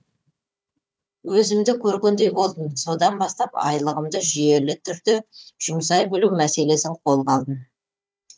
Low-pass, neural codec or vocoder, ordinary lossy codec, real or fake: none; codec, 16 kHz, 4 kbps, FunCodec, trained on Chinese and English, 50 frames a second; none; fake